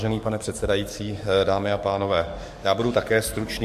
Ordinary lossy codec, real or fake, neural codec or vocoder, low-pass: MP3, 64 kbps; fake; codec, 44.1 kHz, 7.8 kbps, DAC; 14.4 kHz